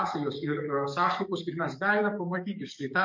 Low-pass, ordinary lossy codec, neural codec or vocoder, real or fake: 7.2 kHz; MP3, 48 kbps; codec, 44.1 kHz, 7.8 kbps, DAC; fake